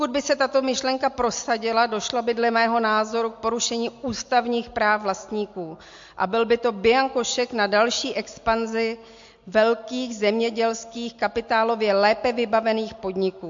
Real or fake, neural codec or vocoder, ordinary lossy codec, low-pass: real; none; MP3, 48 kbps; 7.2 kHz